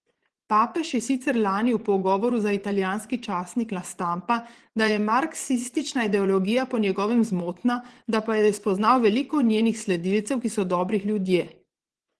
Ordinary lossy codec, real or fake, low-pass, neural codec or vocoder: Opus, 16 kbps; fake; 10.8 kHz; vocoder, 24 kHz, 100 mel bands, Vocos